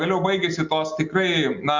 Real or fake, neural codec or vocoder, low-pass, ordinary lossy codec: real; none; 7.2 kHz; MP3, 64 kbps